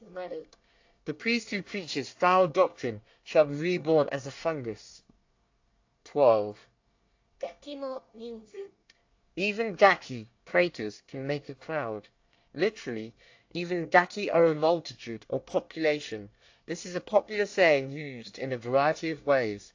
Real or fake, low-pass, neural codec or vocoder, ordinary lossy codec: fake; 7.2 kHz; codec, 24 kHz, 1 kbps, SNAC; AAC, 48 kbps